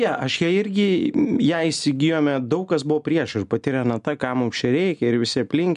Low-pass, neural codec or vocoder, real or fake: 10.8 kHz; none; real